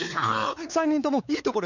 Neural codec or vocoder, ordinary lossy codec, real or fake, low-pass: codec, 16 kHz, 4 kbps, X-Codec, WavLM features, trained on Multilingual LibriSpeech; none; fake; 7.2 kHz